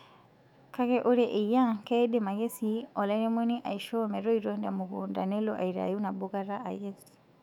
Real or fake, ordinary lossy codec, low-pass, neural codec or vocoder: fake; none; 19.8 kHz; autoencoder, 48 kHz, 128 numbers a frame, DAC-VAE, trained on Japanese speech